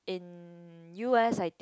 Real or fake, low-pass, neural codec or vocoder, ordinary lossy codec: real; none; none; none